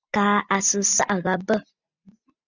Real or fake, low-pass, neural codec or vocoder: real; 7.2 kHz; none